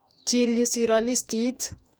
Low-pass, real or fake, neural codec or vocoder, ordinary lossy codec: none; fake; codec, 44.1 kHz, 2.6 kbps, DAC; none